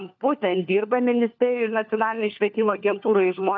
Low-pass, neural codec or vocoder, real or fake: 7.2 kHz; codec, 16 kHz, 4 kbps, FunCodec, trained on LibriTTS, 50 frames a second; fake